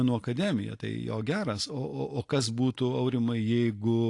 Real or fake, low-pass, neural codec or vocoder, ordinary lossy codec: real; 10.8 kHz; none; AAC, 48 kbps